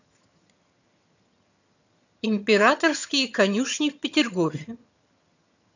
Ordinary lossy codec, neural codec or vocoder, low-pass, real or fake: none; vocoder, 22.05 kHz, 80 mel bands, HiFi-GAN; 7.2 kHz; fake